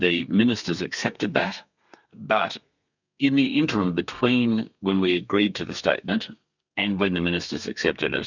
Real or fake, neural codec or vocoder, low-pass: fake; codec, 32 kHz, 1.9 kbps, SNAC; 7.2 kHz